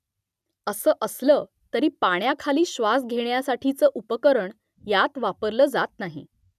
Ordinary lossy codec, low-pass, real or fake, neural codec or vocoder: none; 14.4 kHz; real; none